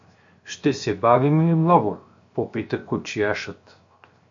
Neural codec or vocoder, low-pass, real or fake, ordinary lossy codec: codec, 16 kHz, 0.7 kbps, FocalCodec; 7.2 kHz; fake; MP3, 48 kbps